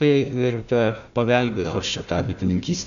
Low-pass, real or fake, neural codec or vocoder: 7.2 kHz; fake; codec, 16 kHz, 1 kbps, FunCodec, trained on Chinese and English, 50 frames a second